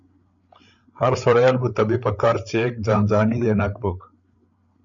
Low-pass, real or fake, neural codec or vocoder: 7.2 kHz; fake; codec, 16 kHz, 8 kbps, FreqCodec, larger model